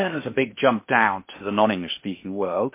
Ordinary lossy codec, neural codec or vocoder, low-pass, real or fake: MP3, 24 kbps; codec, 16 kHz in and 24 kHz out, 0.8 kbps, FocalCodec, streaming, 65536 codes; 3.6 kHz; fake